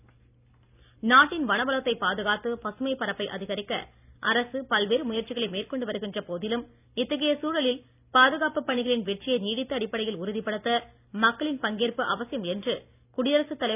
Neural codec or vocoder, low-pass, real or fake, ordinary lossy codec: none; 3.6 kHz; real; none